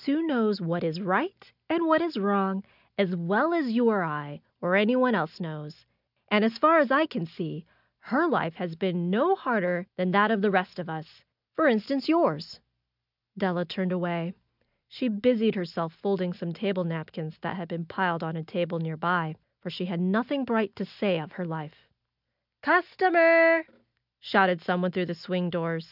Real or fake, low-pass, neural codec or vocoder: real; 5.4 kHz; none